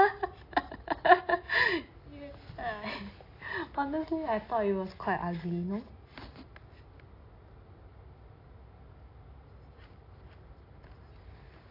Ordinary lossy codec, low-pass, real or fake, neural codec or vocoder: AAC, 32 kbps; 5.4 kHz; real; none